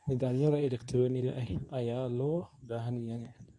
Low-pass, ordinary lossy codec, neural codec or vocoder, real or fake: none; none; codec, 24 kHz, 0.9 kbps, WavTokenizer, medium speech release version 2; fake